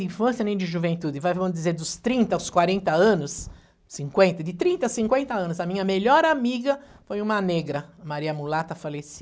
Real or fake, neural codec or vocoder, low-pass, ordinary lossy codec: real; none; none; none